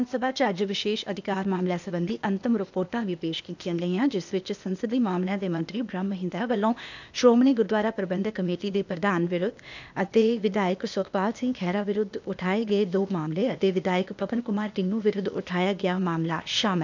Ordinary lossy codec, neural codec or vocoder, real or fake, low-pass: none; codec, 16 kHz, 0.8 kbps, ZipCodec; fake; 7.2 kHz